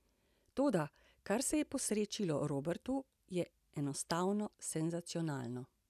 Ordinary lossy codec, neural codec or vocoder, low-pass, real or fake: none; none; 14.4 kHz; real